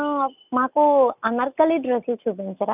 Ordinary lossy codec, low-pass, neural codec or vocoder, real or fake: none; 3.6 kHz; none; real